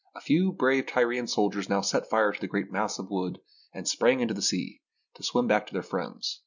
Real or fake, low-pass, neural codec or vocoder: real; 7.2 kHz; none